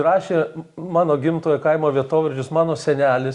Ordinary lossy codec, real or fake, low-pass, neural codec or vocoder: Opus, 64 kbps; real; 10.8 kHz; none